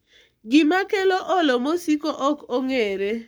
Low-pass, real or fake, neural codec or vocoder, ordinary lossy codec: none; fake; codec, 44.1 kHz, 7.8 kbps, Pupu-Codec; none